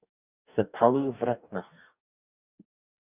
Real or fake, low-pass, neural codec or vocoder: fake; 3.6 kHz; codec, 44.1 kHz, 2.6 kbps, DAC